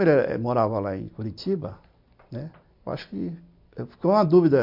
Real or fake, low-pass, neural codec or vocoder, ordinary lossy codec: real; 5.4 kHz; none; MP3, 48 kbps